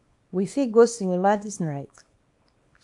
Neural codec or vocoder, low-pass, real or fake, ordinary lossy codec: codec, 24 kHz, 0.9 kbps, WavTokenizer, small release; 10.8 kHz; fake; AAC, 64 kbps